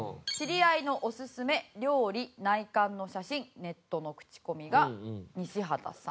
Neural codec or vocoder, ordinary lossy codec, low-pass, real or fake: none; none; none; real